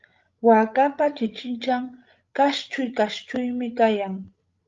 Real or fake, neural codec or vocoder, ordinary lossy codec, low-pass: fake; codec, 16 kHz, 16 kbps, FreqCodec, larger model; Opus, 32 kbps; 7.2 kHz